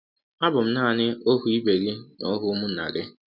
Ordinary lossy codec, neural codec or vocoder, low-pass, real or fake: none; none; 5.4 kHz; real